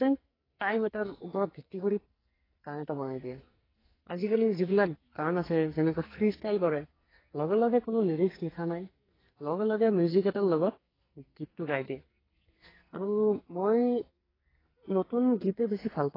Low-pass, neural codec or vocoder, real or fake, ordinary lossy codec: 5.4 kHz; codec, 44.1 kHz, 2.6 kbps, SNAC; fake; AAC, 24 kbps